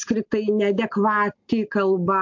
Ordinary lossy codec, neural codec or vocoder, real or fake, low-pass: MP3, 48 kbps; none; real; 7.2 kHz